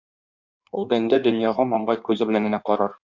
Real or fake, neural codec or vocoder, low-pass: fake; codec, 16 kHz in and 24 kHz out, 1.1 kbps, FireRedTTS-2 codec; 7.2 kHz